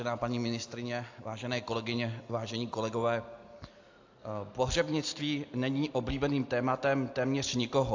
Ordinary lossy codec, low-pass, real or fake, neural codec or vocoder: AAC, 48 kbps; 7.2 kHz; real; none